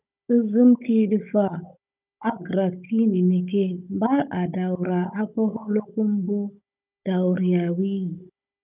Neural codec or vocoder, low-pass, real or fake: codec, 16 kHz, 16 kbps, FunCodec, trained on Chinese and English, 50 frames a second; 3.6 kHz; fake